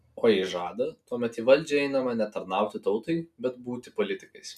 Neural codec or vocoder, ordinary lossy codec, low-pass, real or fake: none; MP3, 96 kbps; 14.4 kHz; real